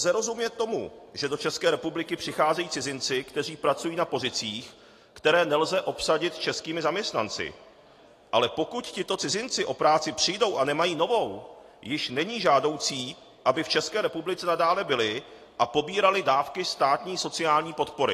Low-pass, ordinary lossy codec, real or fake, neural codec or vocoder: 14.4 kHz; AAC, 48 kbps; real; none